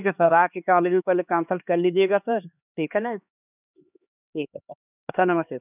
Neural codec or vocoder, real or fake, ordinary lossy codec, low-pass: codec, 16 kHz, 2 kbps, X-Codec, HuBERT features, trained on LibriSpeech; fake; none; 3.6 kHz